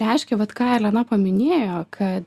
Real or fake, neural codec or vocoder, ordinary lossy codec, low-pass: real; none; Opus, 64 kbps; 14.4 kHz